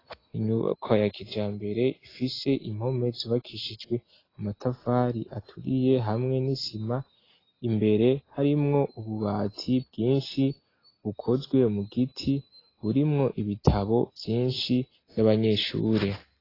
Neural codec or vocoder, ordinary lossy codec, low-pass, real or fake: none; AAC, 24 kbps; 5.4 kHz; real